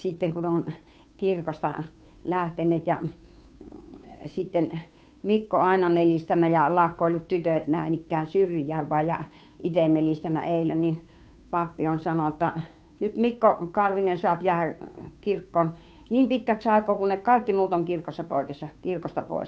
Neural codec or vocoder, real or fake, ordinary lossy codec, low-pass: codec, 16 kHz, 2 kbps, FunCodec, trained on Chinese and English, 25 frames a second; fake; none; none